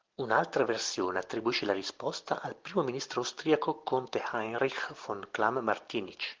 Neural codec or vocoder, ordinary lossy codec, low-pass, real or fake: none; Opus, 16 kbps; 7.2 kHz; real